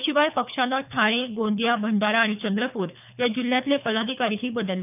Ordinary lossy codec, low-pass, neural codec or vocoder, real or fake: none; 3.6 kHz; codec, 24 kHz, 3 kbps, HILCodec; fake